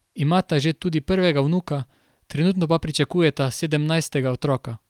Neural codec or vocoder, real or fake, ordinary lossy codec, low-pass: none; real; Opus, 32 kbps; 19.8 kHz